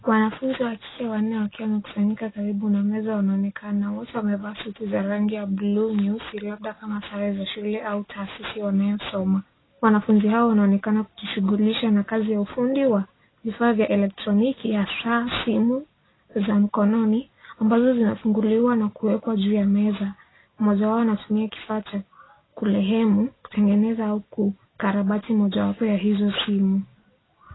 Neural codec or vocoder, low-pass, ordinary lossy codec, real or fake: none; 7.2 kHz; AAC, 16 kbps; real